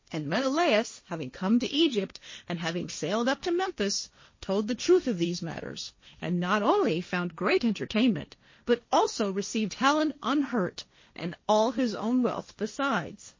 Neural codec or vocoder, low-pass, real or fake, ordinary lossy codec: codec, 16 kHz, 1.1 kbps, Voila-Tokenizer; 7.2 kHz; fake; MP3, 32 kbps